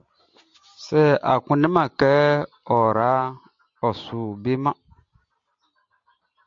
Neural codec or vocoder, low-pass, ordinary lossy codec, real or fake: none; 7.2 kHz; MP3, 48 kbps; real